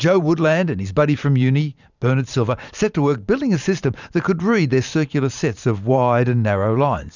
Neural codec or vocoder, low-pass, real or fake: vocoder, 44.1 kHz, 128 mel bands every 512 samples, BigVGAN v2; 7.2 kHz; fake